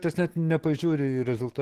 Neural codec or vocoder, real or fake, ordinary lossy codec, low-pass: none; real; Opus, 16 kbps; 14.4 kHz